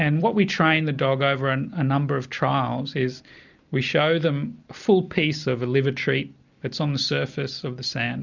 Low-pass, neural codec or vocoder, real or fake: 7.2 kHz; none; real